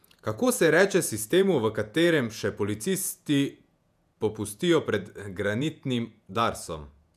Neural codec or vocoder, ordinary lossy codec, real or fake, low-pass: vocoder, 48 kHz, 128 mel bands, Vocos; none; fake; 14.4 kHz